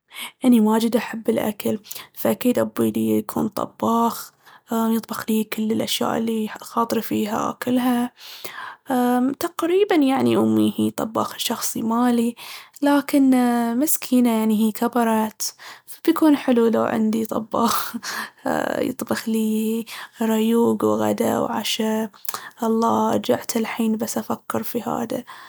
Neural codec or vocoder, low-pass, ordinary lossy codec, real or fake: none; none; none; real